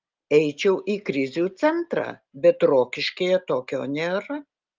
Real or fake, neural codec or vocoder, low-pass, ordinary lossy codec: real; none; 7.2 kHz; Opus, 32 kbps